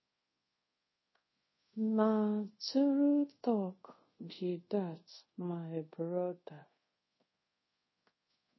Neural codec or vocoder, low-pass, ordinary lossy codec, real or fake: codec, 24 kHz, 0.5 kbps, DualCodec; 7.2 kHz; MP3, 24 kbps; fake